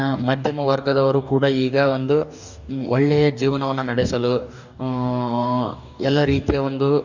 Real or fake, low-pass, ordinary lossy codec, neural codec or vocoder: fake; 7.2 kHz; none; codec, 44.1 kHz, 2.6 kbps, DAC